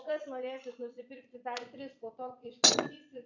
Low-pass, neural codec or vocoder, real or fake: 7.2 kHz; vocoder, 22.05 kHz, 80 mel bands, Vocos; fake